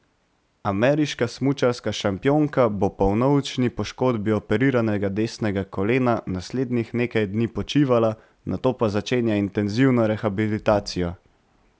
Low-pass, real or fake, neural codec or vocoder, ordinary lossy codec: none; real; none; none